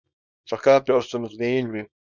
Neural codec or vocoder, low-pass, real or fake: codec, 24 kHz, 0.9 kbps, WavTokenizer, small release; 7.2 kHz; fake